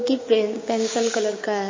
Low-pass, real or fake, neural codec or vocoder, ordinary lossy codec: 7.2 kHz; fake; codec, 16 kHz, 6 kbps, DAC; MP3, 32 kbps